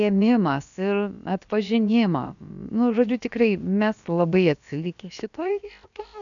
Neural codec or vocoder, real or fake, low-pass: codec, 16 kHz, about 1 kbps, DyCAST, with the encoder's durations; fake; 7.2 kHz